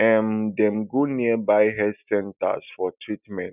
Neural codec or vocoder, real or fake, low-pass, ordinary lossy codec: none; real; 3.6 kHz; none